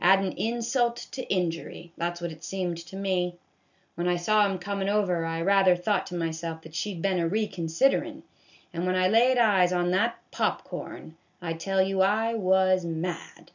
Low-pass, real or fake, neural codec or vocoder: 7.2 kHz; real; none